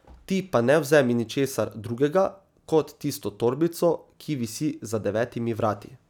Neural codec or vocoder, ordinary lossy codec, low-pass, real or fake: none; none; 19.8 kHz; real